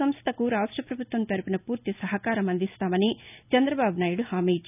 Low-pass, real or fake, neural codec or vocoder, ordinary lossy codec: 3.6 kHz; real; none; none